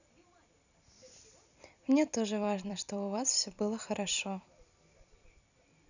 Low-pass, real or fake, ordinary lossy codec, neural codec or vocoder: 7.2 kHz; fake; none; vocoder, 44.1 kHz, 128 mel bands every 256 samples, BigVGAN v2